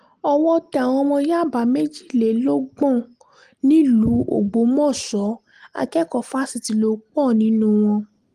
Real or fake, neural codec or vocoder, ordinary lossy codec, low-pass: real; none; Opus, 24 kbps; 14.4 kHz